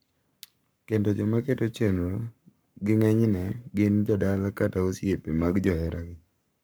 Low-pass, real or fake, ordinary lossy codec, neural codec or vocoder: none; fake; none; codec, 44.1 kHz, 7.8 kbps, Pupu-Codec